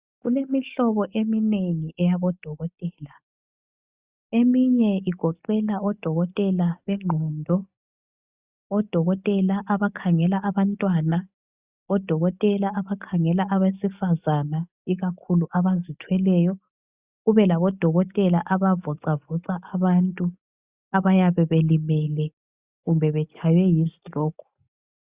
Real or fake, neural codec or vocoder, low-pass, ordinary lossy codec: real; none; 3.6 kHz; Opus, 64 kbps